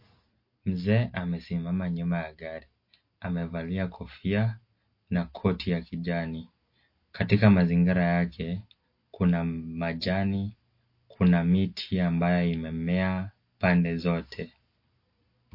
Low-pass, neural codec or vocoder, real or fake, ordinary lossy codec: 5.4 kHz; none; real; MP3, 32 kbps